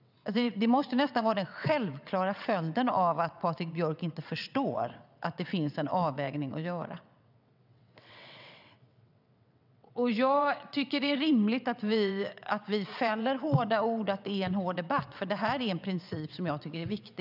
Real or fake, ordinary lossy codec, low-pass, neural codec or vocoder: fake; none; 5.4 kHz; vocoder, 22.05 kHz, 80 mel bands, WaveNeXt